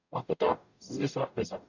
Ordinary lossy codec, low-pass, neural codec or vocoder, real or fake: none; 7.2 kHz; codec, 44.1 kHz, 0.9 kbps, DAC; fake